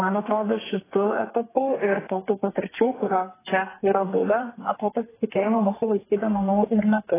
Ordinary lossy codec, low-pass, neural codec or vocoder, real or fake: AAC, 16 kbps; 3.6 kHz; codec, 32 kHz, 1.9 kbps, SNAC; fake